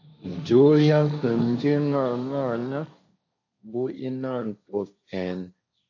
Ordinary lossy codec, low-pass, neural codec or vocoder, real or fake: AAC, 48 kbps; 7.2 kHz; codec, 16 kHz, 1.1 kbps, Voila-Tokenizer; fake